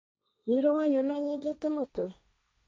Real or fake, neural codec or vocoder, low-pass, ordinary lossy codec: fake; codec, 16 kHz, 1.1 kbps, Voila-Tokenizer; none; none